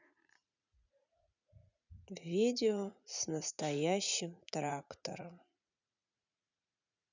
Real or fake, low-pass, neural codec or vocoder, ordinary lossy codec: fake; 7.2 kHz; vocoder, 44.1 kHz, 80 mel bands, Vocos; none